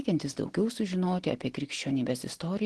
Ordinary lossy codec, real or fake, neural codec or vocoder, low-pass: Opus, 16 kbps; real; none; 10.8 kHz